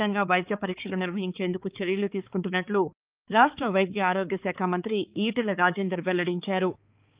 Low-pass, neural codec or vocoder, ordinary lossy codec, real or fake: 3.6 kHz; codec, 16 kHz, 4 kbps, X-Codec, HuBERT features, trained on balanced general audio; Opus, 24 kbps; fake